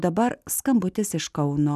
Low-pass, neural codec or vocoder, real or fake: 14.4 kHz; none; real